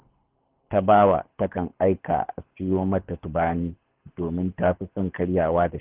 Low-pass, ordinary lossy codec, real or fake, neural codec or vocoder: 3.6 kHz; Opus, 16 kbps; fake; codec, 24 kHz, 6 kbps, HILCodec